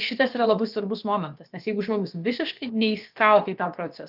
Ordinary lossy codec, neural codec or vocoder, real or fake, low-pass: Opus, 32 kbps; codec, 16 kHz, about 1 kbps, DyCAST, with the encoder's durations; fake; 5.4 kHz